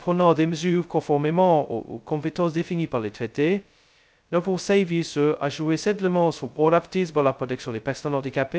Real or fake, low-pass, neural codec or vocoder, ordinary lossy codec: fake; none; codec, 16 kHz, 0.2 kbps, FocalCodec; none